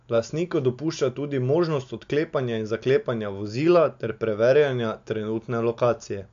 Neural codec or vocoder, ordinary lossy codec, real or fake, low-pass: none; AAC, 64 kbps; real; 7.2 kHz